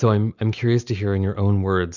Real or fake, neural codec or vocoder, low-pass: real; none; 7.2 kHz